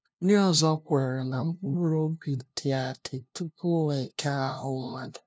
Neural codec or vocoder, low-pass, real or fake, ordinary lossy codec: codec, 16 kHz, 0.5 kbps, FunCodec, trained on LibriTTS, 25 frames a second; none; fake; none